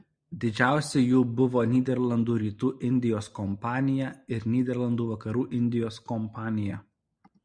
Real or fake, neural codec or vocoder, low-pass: real; none; 9.9 kHz